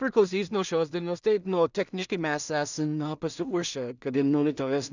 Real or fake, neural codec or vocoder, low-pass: fake; codec, 16 kHz in and 24 kHz out, 0.4 kbps, LongCat-Audio-Codec, two codebook decoder; 7.2 kHz